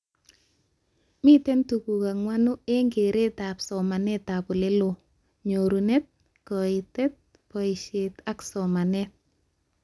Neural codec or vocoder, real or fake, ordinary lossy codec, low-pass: none; real; none; none